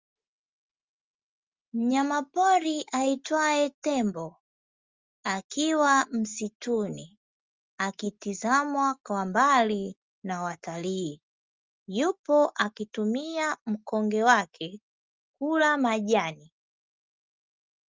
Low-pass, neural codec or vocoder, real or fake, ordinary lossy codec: 7.2 kHz; none; real; Opus, 32 kbps